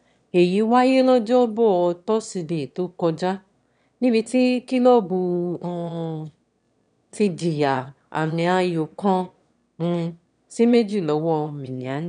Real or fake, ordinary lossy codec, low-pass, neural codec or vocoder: fake; none; 9.9 kHz; autoencoder, 22.05 kHz, a latent of 192 numbers a frame, VITS, trained on one speaker